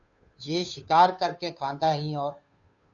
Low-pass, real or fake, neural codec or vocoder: 7.2 kHz; fake; codec, 16 kHz, 2 kbps, FunCodec, trained on Chinese and English, 25 frames a second